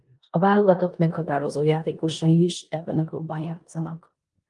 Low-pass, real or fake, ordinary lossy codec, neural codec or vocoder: 10.8 kHz; fake; Opus, 32 kbps; codec, 16 kHz in and 24 kHz out, 0.9 kbps, LongCat-Audio-Codec, four codebook decoder